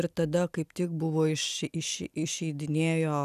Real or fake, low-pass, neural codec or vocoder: real; 14.4 kHz; none